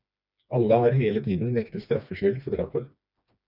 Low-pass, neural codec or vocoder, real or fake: 5.4 kHz; codec, 16 kHz, 2 kbps, FreqCodec, smaller model; fake